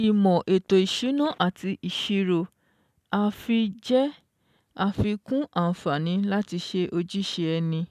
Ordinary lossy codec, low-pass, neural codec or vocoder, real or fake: none; 14.4 kHz; none; real